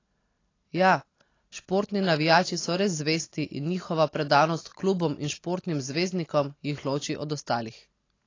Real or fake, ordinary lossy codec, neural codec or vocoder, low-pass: real; AAC, 32 kbps; none; 7.2 kHz